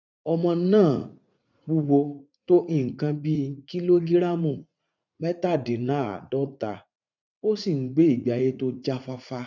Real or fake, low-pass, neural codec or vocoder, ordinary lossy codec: fake; 7.2 kHz; vocoder, 44.1 kHz, 80 mel bands, Vocos; none